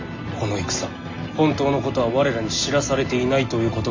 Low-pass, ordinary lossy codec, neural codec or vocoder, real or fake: 7.2 kHz; MP3, 48 kbps; none; real